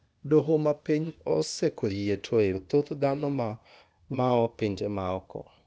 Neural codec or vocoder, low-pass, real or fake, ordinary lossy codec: codec, 16 kHz, 0.8 kbps, ZipCodec; none; fake; none